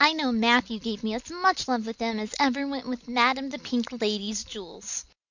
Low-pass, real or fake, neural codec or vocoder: 7.2 kHz; real; none